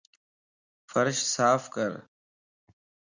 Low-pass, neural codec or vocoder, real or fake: 7.2 kHz; none; real